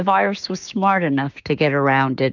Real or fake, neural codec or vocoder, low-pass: fake; codec, 16 kHz, 6 kbps, DAC; 7.2 kHz